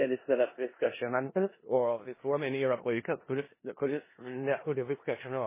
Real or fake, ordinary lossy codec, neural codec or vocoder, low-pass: fake; MP3, 16 kbps; codec, 16 kHz in and 24 kHz out, 0.4 kbps, LongCat-Audio-Codec, four codebook decoder; 3.6 kHz